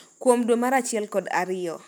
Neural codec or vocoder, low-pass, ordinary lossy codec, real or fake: none; none; none; real